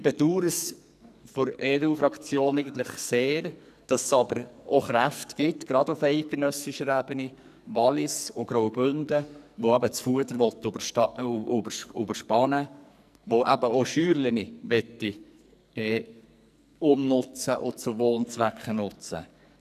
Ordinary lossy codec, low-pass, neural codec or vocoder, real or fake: none; 14.4 kHz; codec, 44.1 kHz, 2.6 kbps, SNAC; fake